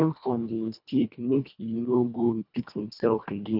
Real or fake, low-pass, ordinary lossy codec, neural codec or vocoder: fake; 5.4 kHz; none; codec, 24 kHz, 1.5 kbps, HILCodec